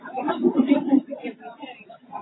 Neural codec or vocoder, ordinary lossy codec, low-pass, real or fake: none; AAC, 16 kbps; 7.2 kHz; real